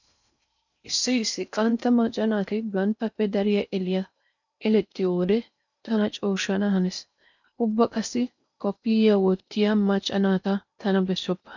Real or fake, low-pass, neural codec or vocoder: fake; 7.2 kHz; codec, 16 kHz in and 24 kHz out, 0.6 kbps, FocalCodec, streaming, 4096 codes